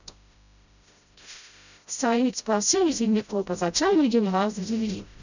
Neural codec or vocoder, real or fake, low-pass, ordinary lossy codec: codec, 16 kHz, 0.5 kbps, FreqCodec, smaller model; fake; 7.2 kHz; none